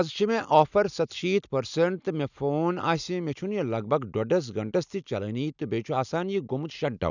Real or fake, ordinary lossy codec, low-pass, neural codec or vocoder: real; none; 7.2 kHz; none